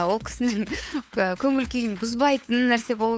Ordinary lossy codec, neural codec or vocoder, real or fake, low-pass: none; codec, 16 kHz, 4 kbps, FunCodec, trained on LibriTTS, 50 frames a second; fake; none